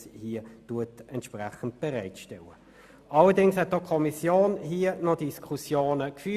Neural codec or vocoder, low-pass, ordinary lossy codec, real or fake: none; 14.4 kHz; Opus, 64 kbps; real